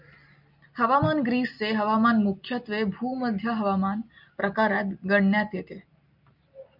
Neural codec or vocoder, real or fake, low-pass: none; real; 5.4 kHz